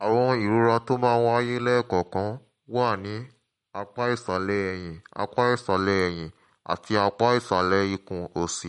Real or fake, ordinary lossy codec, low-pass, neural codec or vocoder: real; MP3, 48 kbps; 19.8 kHz; none